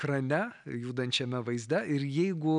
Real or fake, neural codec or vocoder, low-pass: real; none; 9.9 kHz